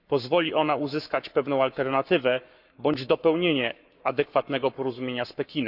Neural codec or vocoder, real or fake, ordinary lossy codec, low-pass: codec, 44.1 kHz, 7.8 kbps, Pupu-Codec; fake; none; 5.4 kHz